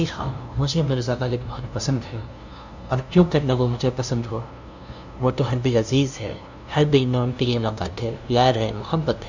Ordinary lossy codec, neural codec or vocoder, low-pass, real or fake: none; codec, 16 kHz, 0.5 kbps, FunCodec, trained on LibriTTS, 25 frames a second; 7.2 kHz; fake